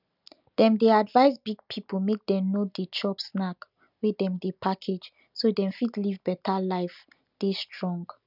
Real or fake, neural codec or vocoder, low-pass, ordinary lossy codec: real; none; 5.4 kHz; none